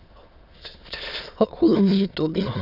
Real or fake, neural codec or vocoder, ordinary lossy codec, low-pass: fake; autoencoder, 22.05 kHz, a latent of 192 numbers a frame, VITS, trained on many speakers; none; 5.4 kHz